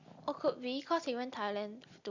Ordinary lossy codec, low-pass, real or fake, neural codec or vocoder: Opus, 64 kbps; 7.2 kHz; real; none